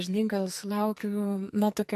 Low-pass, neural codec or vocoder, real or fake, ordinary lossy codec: 14.4 kHz; codec, 44.1 kHz, 2.6 kbps, SNAC; fake; MP3, 64 kbps